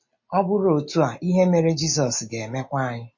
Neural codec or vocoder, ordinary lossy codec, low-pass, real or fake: none; MP3, 32 kbps; 7.2 kHz; real